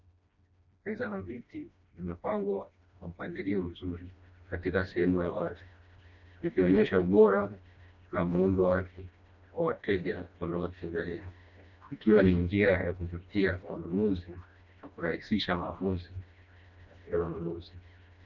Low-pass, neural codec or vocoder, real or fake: 7.2 kHz; codec, 16 kHz, 1 kbps, FreqCodec, smaller model; fake